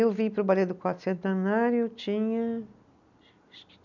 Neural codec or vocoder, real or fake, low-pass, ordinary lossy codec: none; real; 7.2 kHz; none